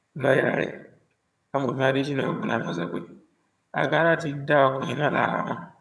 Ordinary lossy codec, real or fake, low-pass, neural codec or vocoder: none; fake; none; vocoder, 22.05 kHz, 80 mel bands, HiFi-GAN